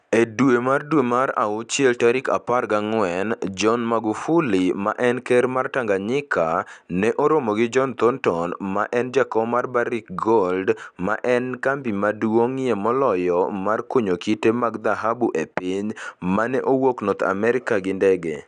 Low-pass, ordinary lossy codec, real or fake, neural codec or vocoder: 9.9 kHz; none; real; none